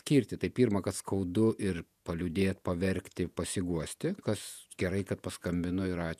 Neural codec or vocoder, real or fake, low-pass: vocoder, 48 kHz, 128 mel bands, Vocos; fake; 14.4 kHz